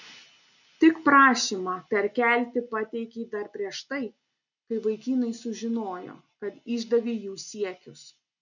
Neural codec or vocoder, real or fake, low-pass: none; real; 7.2 kHz